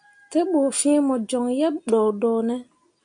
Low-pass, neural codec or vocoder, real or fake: 9.9 kHz; none; real